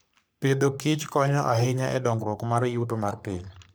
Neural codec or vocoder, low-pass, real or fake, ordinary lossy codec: codec, 44.1 kHz, 3.4 kbps, Pupu-Codec; none; fake; none